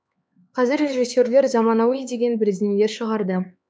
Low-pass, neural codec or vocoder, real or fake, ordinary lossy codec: none; codec, 16 kHz, 4 kbps, X-Codec, HuBERT features, trained on LibriSpeech; fake; none